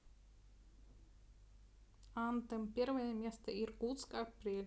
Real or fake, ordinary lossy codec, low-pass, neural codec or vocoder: real; none; none; none